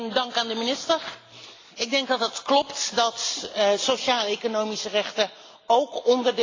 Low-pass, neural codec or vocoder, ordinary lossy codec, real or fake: 7.2 kHz; none; AAC, 32 kbps; real